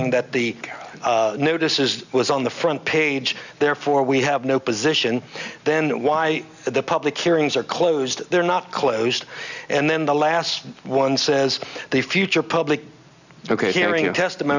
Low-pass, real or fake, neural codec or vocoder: 7.2 kHz; real; none